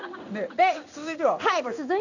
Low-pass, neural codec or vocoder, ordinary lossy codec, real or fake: 7.2 kHz; codec, 16 kHz in and 24 kHz out, 1 kbps, XY-Tokenizer; none; fake